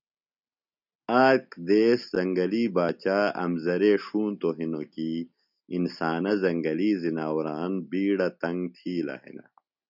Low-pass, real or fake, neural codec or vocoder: 5.4 kHz; real; none